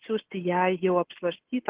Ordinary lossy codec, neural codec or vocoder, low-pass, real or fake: Opus, 16 kbps; none; 3.6 kHz; real